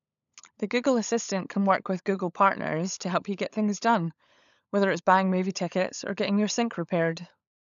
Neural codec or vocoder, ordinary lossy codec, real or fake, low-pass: codec, 16 kHz, 16 kbps, FunCodec, trained on LibriTTS, 50 frames a second; none; fake; 7.2 kHz